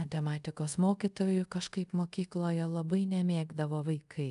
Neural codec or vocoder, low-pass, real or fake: codec, 24 kHz, 0.5 kbps, DualCodec; 10.8 kHz; fake